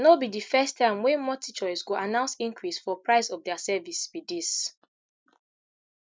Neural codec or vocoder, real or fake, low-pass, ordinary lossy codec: none; real; none; none